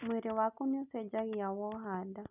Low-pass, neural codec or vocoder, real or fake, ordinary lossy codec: 3.6 kHz; none; real; none